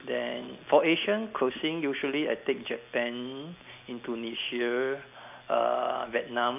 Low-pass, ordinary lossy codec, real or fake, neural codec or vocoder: 3.6 kHz; none; real; none